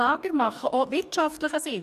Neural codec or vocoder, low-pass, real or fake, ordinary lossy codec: codec, 44.1 kHz, 2.6 kbps, DAC; 14.4 kHz; fake; none